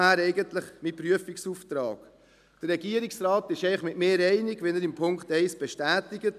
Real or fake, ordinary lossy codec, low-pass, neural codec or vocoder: real; none; 14.4 kHz; none